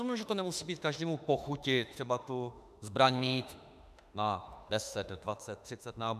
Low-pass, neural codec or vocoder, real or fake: 14.4 kHz; autoencoder, 48 kHz, 32 numbers a frame, DAC-VAE, trained on Japanese speech; fake